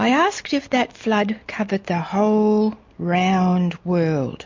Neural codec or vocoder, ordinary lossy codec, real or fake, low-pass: none; MP3, 48 kbps; real; 7.2 kHz